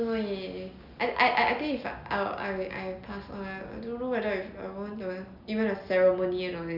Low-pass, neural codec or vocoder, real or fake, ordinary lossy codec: 5.4 kHz; none; real; none